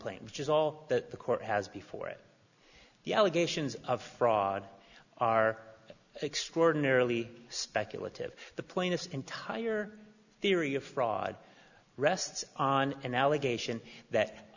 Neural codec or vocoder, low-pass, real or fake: none; 7.2 kHz; real